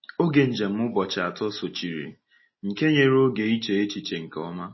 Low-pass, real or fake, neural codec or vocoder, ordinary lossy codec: 7.2 kHz; real; none; MP3, 24 kbps